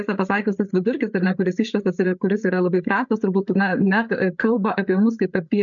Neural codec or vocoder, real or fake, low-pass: codec, 16 kHz, 8 kbps, FreqCodec, larger model; fake; 7.2 kHz